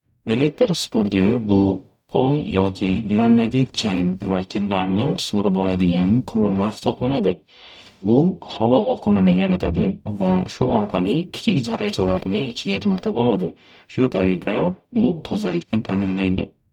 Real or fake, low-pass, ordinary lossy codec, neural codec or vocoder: fake; 19.8 kHz; none; codec, 44.1 kHz, 0.9 kbps, DAC